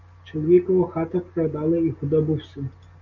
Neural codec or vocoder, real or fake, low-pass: none; real; 7.2 kHz